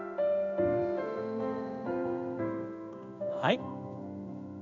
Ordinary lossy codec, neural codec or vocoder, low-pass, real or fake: none; codec, 16 kHz in and 24 kHz out, 1 kbps, XY-Tokenizer; 7.2 kHz; fake